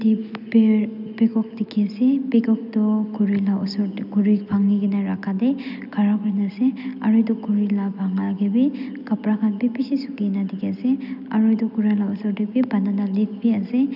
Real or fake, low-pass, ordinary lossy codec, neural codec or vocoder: real; 5.4 kHz; none; none